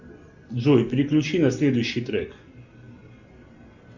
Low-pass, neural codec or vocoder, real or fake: 7.2 kHz; none; real